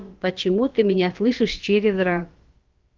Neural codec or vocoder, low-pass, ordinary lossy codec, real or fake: codec, 16 kHz, about 1 kbps, DyCAST, with the encoder's durations; 7.2 kHz; Opus, 32 kbps; fake